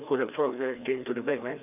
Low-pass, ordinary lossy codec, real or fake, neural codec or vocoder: 3.6 kHz; none; fake; codec, 16 kHz, 2 kbps, FreqCodec, larger model